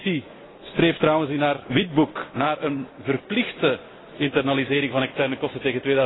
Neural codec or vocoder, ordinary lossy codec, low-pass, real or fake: none; AAC, 16 kbps; 7.2 kHz; real